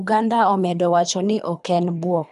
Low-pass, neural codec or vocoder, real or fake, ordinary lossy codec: 10.8 kHz; codec, 24 kHz, 3 kbps, HILCodec; fake; none